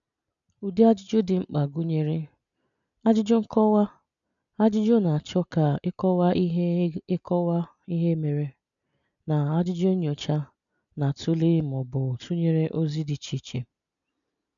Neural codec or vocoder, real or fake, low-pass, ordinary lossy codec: none; real; 7.2 kHz; none